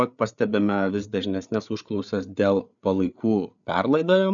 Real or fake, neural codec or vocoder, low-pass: fake; codec, 16 kHz, 8 kbps, FreqCodec, larger model; 7.2 kHz